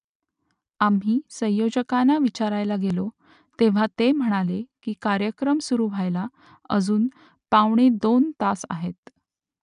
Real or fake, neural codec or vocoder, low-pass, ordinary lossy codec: real; none; 10.8 kHz; none